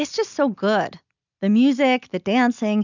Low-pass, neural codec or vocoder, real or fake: 7.2 kHz; none; real